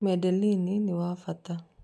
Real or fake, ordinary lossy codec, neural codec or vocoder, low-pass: real; none; none; none